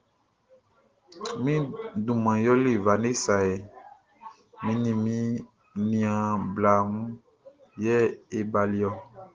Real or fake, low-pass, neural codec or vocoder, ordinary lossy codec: real; 7.2 kHz; none; Opus, 16 kbps